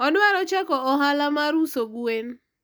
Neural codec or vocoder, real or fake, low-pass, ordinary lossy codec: none; real; none; none